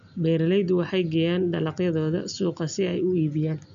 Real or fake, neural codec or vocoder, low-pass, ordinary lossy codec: real; none; 7.2 kHz; MP3, 96 kbps